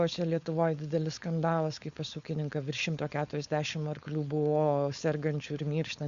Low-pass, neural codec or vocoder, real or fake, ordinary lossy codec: 7.2 kHz; codec, 16 kHz, 4.8 kbps, FACodec; fake; Opus, 64 kbps